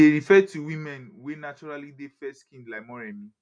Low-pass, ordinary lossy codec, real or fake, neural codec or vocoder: 9.9 kHz; none; real; none